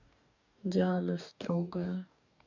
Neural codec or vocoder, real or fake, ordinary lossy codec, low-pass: codec, 44.1 kHz, 2.6 kbps, DAC; fake; none; 7.2 kHz